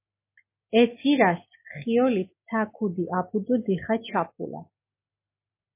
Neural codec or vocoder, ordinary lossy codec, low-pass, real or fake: none; MP3, 24 kbps; 3.6 kHz; real